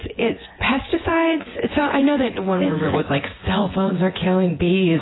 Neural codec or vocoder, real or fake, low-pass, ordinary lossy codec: vocoder, 24 kHz, 100 mel bands, Vocos; fake; 7.2 kHz; AAC, 16 kbps